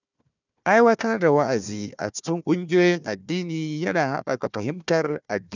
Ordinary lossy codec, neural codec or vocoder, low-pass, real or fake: none; codec, 16 kHz, 1 kbps, FunCodec, trained on Chinese and English, 50 frames a second; 7.2 kHz; fake